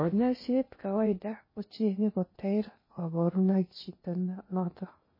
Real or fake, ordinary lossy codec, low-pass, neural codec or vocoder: fake; MP3, 24 kbps; 5.4 kHz; codec, 16 kHz in and 24 kHz out, 0.6 kbps, FocalCodec, streaming, 2048 codes